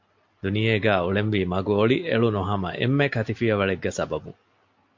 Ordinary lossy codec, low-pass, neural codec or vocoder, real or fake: MP3, 48 kbps; 7.2 kHz; none; real